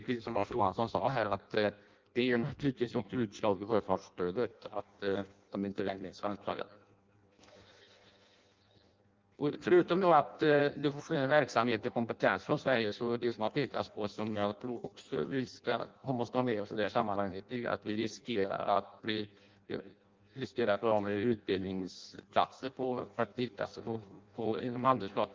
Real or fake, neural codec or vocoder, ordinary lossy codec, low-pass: fake; codec, 16 kHz in and 24 kHz out, 0.6 kbps, FireRedTTS-2 codec; Opus, 32 kbps; 7.2 kHz